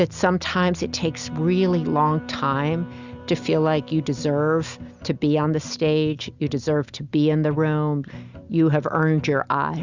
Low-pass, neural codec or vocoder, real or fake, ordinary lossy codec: 7.2 kHz; none; real; Opus, 64 kbps